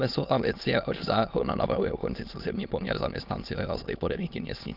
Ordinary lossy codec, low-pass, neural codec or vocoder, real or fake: Opus, 32 kbps; 5.4 kHz; autoencoder, 22.05 kHz, a latent of 192 numbers a frame, VITS, trained on many speakers; fake